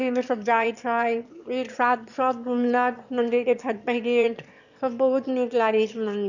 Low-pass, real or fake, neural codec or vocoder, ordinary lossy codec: 7.2 kHz; fake; autoencoder, 22.05 kHz, a latent of 192 numbers a frame, VITS, trained on one speaker; none